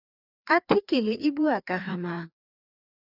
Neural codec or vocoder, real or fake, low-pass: codec, 16 kHz, 2 kbps, FreqCodec, larger model; fake; 5.4 kHz